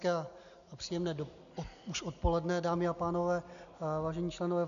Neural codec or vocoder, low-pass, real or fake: none; 7.2 kHz; real